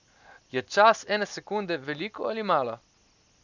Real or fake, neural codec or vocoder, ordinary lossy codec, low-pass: real; none; none; 7.2 kHz